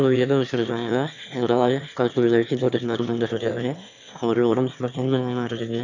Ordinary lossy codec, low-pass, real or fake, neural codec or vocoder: none; 7.2 kHz; fake; autoencoder, 22.05 kHz, a latent of 192 numbers a frame, VITS, trained on one speaker